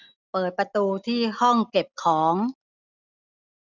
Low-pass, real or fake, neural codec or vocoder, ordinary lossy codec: 7.2 kHz; real; none; none